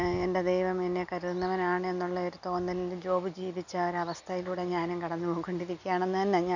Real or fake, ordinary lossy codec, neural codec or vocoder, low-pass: real; none; none; 7.2 kHz